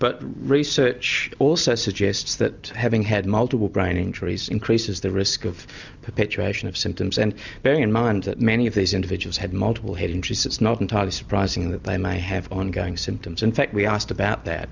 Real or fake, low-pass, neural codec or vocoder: real; 7.2 kHz; none